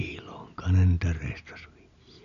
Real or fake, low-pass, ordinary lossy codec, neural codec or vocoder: real; 7.2 kHz; none; none